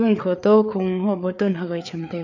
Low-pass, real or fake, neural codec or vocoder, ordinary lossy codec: 7.2 kHz; fake; codec, 16 kHz, 4 kbps, FreqCodec, larger model; none